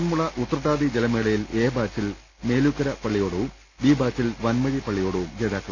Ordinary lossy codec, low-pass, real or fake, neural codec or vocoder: none; none; real; none